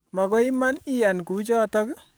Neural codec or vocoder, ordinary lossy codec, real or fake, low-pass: codec, 44.1 kHz, 7.8 kbps, DAC; none; fake; none